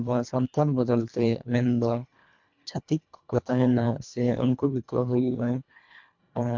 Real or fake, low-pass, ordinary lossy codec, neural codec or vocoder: fake; 7.2 kHz; MP3, 64 kbps; codec, 24 kHz, 1.5 kbps, HILCodec